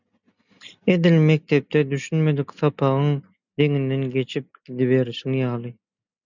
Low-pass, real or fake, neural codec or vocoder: 7.2 kHz; real; none